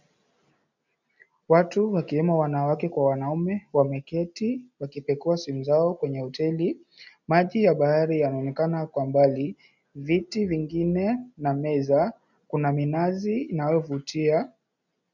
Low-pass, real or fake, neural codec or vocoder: 7.2 kHz; real; none